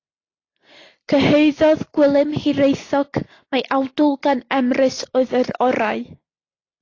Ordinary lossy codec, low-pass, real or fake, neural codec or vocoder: AAC, 32 kbps; 7.2 kHz; real; none